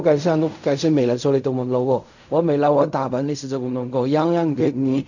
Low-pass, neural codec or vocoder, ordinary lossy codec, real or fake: 7.2 kHz; codec, 16 kHz in and 24 kHz out, 0.4 kbps, LongCat-Audio-Codec, fine tuned four codebook decoder; none; fake